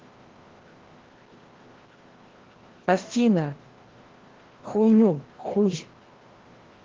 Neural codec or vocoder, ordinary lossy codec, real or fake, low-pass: codec, 16 kHz, 1 kbps, FreqCodec, larger model; Opus, 16 kbps; fake; 7.2 kHz